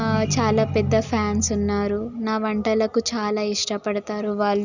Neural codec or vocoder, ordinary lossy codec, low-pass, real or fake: none; none; 7.2 kHz; real